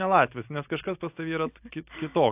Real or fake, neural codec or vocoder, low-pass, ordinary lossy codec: real; none; 3.6 kHz; AAC, 32 kbps